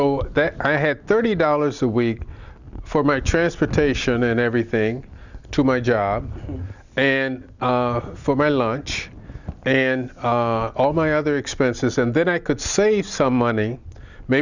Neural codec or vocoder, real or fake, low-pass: none; real; 7.2 kHz